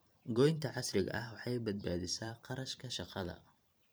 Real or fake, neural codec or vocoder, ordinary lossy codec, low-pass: fake; vocoder, 44.1 kHz, 128 mel bands every 512 samples, BigVGAN v2; none; none